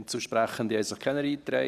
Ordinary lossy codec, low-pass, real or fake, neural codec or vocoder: none; 14.4 kHz; fake; vocoder, 44.1 kHz, 128 mel bands every 512 samples, BigVGAN v2